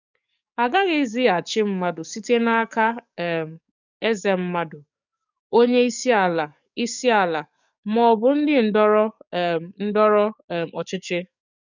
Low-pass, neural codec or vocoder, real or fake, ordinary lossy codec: 7.2 kHz; codec, 44.1 kHz, 7.8 kbps, DAC; fake; none